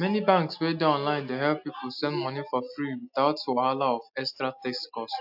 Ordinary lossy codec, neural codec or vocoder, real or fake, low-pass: AAC, 48 kbps; none; real; 5.4 kHz